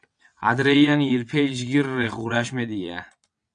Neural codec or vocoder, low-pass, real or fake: vocoder, 22.05 kHz, 80 mel bands, WaveNeXt; 9.9 kHz; fake